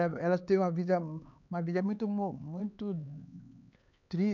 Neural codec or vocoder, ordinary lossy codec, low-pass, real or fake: codec, 16 kHz, 4 kbps, X-Codec, HuBERT features, trained on LibriSpeech; none; 7.2 kHz; fake